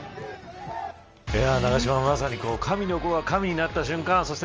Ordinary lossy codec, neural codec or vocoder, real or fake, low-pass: Opus, 24 kbps; none; real; 7.2 kHz